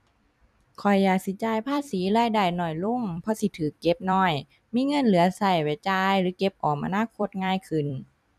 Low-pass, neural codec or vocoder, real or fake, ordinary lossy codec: 14.4 kHz; vocoder, 44.1 kHz, 128 mel bands every 256 samples, BigVGAN v2; fake; none